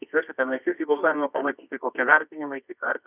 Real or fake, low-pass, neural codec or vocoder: fake; 3.6 kHz; codec, 24 kHz, 0.9 kbps, WavTokenizer, medium music audio release